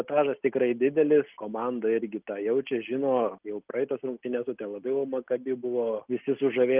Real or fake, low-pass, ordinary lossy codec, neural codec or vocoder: real; 3.6 kHz; Opus, 32 kbps; none